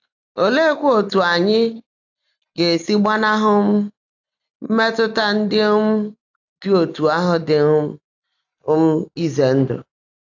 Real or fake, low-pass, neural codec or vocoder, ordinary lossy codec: real; 7.2 kHz; none; AAC, 48 kbps